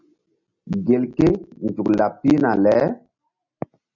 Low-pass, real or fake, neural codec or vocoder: 7.2 kHz; real; none